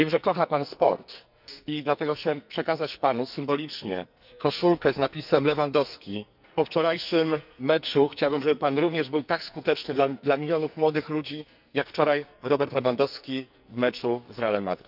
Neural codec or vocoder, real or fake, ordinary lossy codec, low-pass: codec, 44.1 kHz, 2.6 kbps, SNAC; fake; none; 5.4 kHz